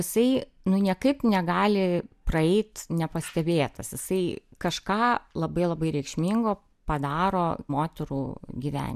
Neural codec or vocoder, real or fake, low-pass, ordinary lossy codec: none; real; 14.4 kHz; MP3, 96 kbps